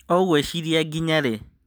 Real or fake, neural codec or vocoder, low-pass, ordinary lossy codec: real; none; none; none